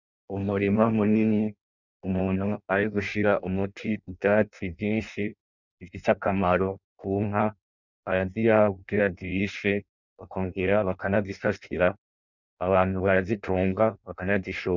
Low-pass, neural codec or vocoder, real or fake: 7.2 kHz; codec, 16 kHz in and 24 kHz out, 0.6 kbps, FireRedTTS-2 codec; fake